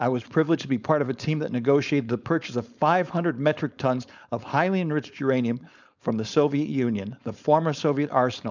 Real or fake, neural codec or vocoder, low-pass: fake; codec, 16 kHz, 4.8 kbps, FACodec; 7.2 kHz